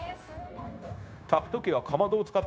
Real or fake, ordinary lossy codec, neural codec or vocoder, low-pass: fake; none; codec, 16 kHz, 0.9 kbps, LongCat-Audio-Codec; none